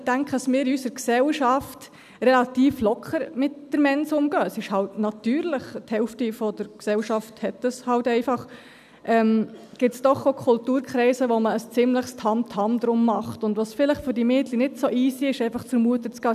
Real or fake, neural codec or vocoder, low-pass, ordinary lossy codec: real; none; 14.4 kHz; none